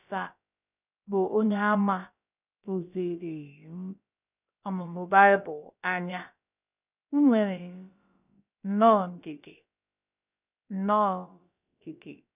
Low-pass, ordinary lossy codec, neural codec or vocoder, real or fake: 3.6 kHz; none; codec, 16 kHz, about 1 kbps, DyCAST, with the encoder's durations; fake